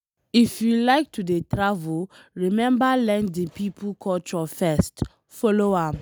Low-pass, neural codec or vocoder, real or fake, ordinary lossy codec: none; none; real; none